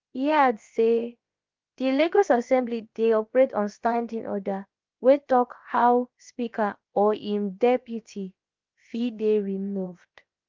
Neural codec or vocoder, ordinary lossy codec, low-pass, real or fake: codec, 16 kHz, about 1 kbps, DyCAST, with the encoder's durations; Opus, 32 kbps; 7.2 kHz; fake